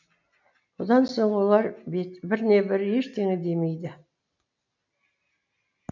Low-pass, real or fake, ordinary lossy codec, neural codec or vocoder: 7.2 kHz; real; none; none